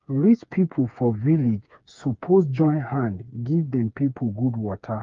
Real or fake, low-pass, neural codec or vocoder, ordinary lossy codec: fake; 7.2 kHz; codec, 16 kHz, 4 kbps, FreqCodec, smaller model; Opus, 32 kbps